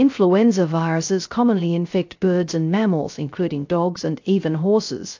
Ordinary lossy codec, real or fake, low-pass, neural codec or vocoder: AAC, 48 kbps; fake; 7.2 kHz; codec, 16 kHz, 0.3 kbps, FocalCodec